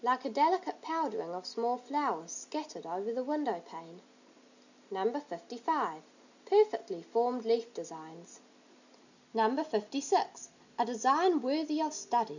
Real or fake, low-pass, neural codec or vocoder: real; 7.2 kHz; none